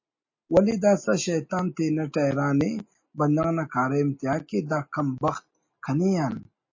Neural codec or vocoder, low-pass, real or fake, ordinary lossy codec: none; 7.2 kHz; real; MP3, 32 kbps